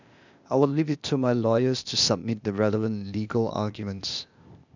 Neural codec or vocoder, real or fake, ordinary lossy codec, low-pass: codec, 16 kHz, 0.8 kbps, ZipCodec; fake; none; 7.2 kHz